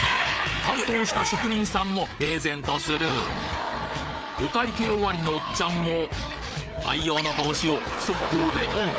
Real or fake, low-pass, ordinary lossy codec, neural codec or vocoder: fake; none; none; codec, 16 kHz, 4 kbps, FreqCodec, larger model